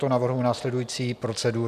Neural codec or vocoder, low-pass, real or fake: none; 14.4 kHz; real